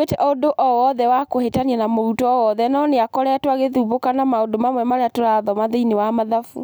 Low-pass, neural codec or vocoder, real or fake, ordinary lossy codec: none; none; real; none